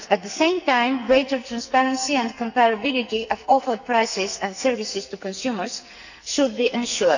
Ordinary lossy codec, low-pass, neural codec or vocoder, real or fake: none; 7.2 kHz; codec, 44.1 kHz, 2.6 kbps, SNAC; fake